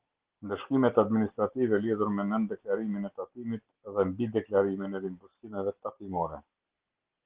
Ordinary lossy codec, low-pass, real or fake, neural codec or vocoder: Opus, 16 kbps; 3.6 kHz; real; none